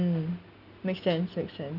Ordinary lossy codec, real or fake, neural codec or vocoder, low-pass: none; real; none; 5.4 kHz